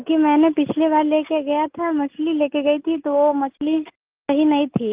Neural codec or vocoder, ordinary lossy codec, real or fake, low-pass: none; Opus, 24 kbps; real; 3.6 kHz